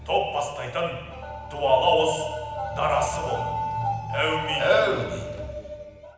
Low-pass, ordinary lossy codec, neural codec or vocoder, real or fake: none; none; none; real